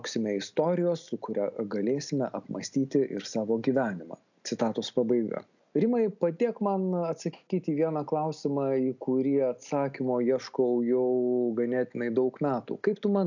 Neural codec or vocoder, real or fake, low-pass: none; real; 7.2 kHz